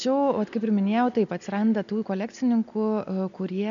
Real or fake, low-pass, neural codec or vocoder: real; 7.2 kHz; none